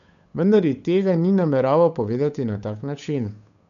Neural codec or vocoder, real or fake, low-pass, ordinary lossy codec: codec, 16 kHz, 8 kbps, FunCodec, trained on Chinese and English, 25 frames a second; fake; 7.2 kHz; none